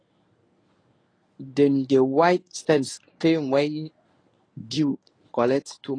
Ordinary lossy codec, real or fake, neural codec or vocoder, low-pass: AAC, 32 kbps; fake; codec, 24 kHz, 0.9 kbps, WavTokenizer, medium speech release version 1; 9.9 kHz